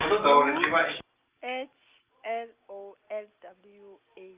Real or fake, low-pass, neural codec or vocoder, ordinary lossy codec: real; 3.6 kHz; none; Opus, 32 kbps